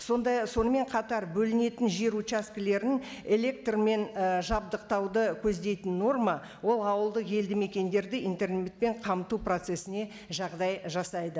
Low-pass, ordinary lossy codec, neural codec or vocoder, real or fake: none; none; none; real